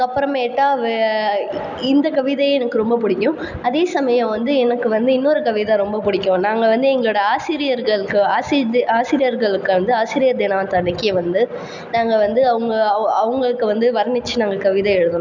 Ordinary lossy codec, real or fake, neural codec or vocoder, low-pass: none; real; none; 7.2 kHz